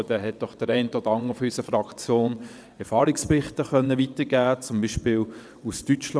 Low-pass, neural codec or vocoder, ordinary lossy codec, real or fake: none; vocoder, 22.05 kHz, 80 mel bands, WaveNeXt; none; fake